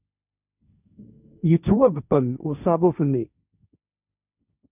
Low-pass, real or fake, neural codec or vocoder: 3.6 kHz; fake; codec, 16 kHz, 1.1 kbps, Voila-Tokenizer